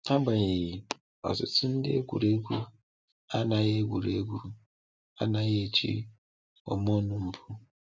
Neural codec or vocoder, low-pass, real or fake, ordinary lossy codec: none; none; real; none